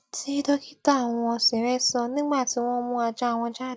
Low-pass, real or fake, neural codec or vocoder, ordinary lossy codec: none; real; none; none